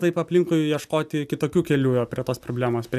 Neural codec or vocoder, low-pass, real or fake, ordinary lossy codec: none; 14.4 kHz; real; AAC, 96 kbps